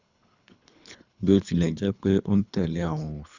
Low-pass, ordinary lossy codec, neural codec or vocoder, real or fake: 7.2 kHz; Opus, 64 kbps; codec, 24 kHz, 3 kbps, HILCodec; fake